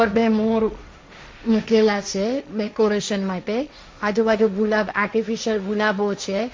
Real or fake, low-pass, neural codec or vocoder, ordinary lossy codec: fake; none; codec, 16 kHz, 1.1 kbps, Voila-Tokenizer; none